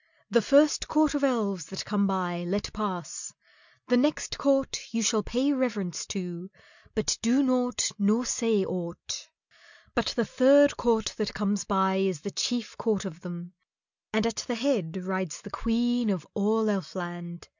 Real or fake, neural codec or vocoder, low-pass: real; none; 7.2 kHz